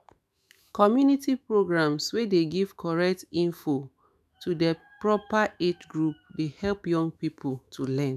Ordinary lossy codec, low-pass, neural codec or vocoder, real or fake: none; 14.4 kHz; autoencoder, 48 kHz, 128 numbers a frame, DAC-VAE, trained on Japanese speech; fake